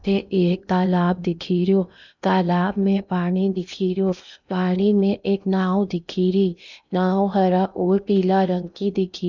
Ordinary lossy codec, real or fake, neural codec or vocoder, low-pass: none; fake; codec, 16 kHz in and 24 kHz out, 0.8 kbps, FocalCodec, streaming, 65536 codes; 7.2 kHz